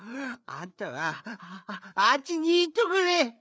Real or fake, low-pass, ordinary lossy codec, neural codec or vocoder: fake; none; none; codec, 16 kHz, 4 kbps, FreqCodec, larger model